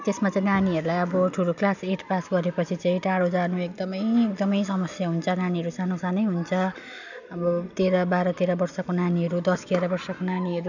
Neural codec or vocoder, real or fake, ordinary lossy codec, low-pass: none; real; none; 7.2 kHz